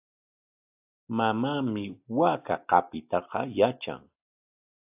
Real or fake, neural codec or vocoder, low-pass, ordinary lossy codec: real; none; 3.6 kHz; AAC, 32 kbps